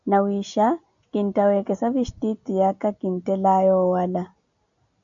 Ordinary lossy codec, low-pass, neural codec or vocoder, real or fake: MP3, 96 kbps; 7.2 kHz; none; real